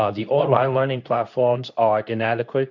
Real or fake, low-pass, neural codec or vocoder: fake; 7.2 kHz; codec, 24 kHz, 0.9 kbps, WavTokenizer, medium speech release version 2